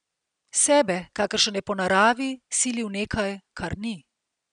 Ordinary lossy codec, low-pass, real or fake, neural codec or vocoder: none; 9.9 kHz; real; none